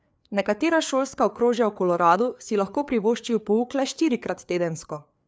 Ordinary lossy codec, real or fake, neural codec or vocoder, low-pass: none; fake; codec, 16 kHz, 4 kbps, FreqCodec, larger model; none